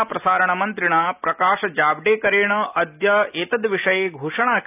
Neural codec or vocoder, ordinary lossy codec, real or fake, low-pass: none; none; real; 3.6 kHz